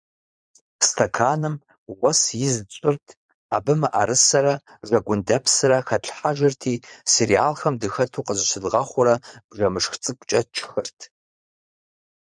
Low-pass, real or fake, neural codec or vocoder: 9.9 kHz; fake; vocoder, 22.05 kHz, 80 mel bands, Vocos